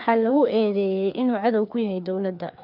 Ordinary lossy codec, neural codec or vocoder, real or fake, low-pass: none; codec, 16 kHz, 2 kbps, FreqCodec, larger model; fake; 5.4 kHz